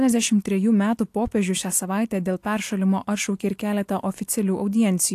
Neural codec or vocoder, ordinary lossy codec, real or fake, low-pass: none; AAC, 64 kbps; real; 14.4 kHz